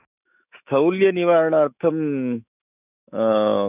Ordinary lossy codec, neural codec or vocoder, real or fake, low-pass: none; autoencoder, 48 kHz, 128 numbers a frame, DAC-VAE, trained on Japanese speech; fake; 3.6 kHz